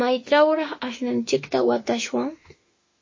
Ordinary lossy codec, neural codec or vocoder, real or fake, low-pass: MP3, 32 kbps; autoencoder, 48 kHz, 32 numbers a frame, DAC-VAE, trained on Japanese speech; fake; 7.2 kHz